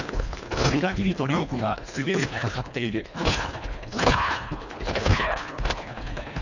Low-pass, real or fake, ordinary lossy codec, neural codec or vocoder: 7.2 kHz; fake; none; codec, 24 kHz, 1.5 kbps, HILCodec